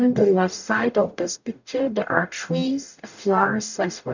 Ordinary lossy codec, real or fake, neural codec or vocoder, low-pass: none; fake; codec, 44.1 kHz, 0.9 kbps, DAC; 7.2 kHz